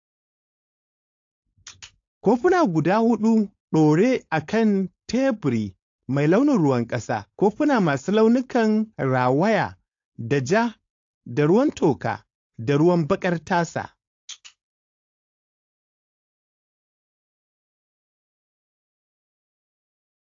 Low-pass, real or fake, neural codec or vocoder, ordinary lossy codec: 7.2 kHz; fake; codec, 16 kHz, 4.8 kbps, FACodec; AAC, 48 kbps